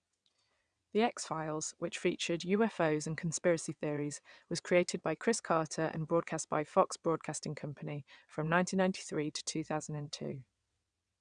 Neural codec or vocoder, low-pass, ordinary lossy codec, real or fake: vocoder, 22.05 kHz, 80 mel bands, WaveNeXt; 9.9 kHz; none; fake